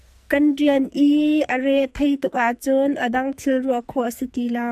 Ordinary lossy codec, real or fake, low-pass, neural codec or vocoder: MP3, 96 kbps; fake; 14.4 kHz; codec, 44.1 kHz, 2.6 kbps, SNAC